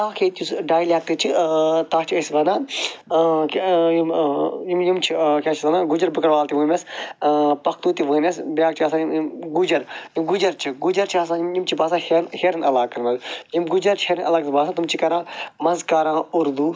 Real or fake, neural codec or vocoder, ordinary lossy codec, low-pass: real; none; none; none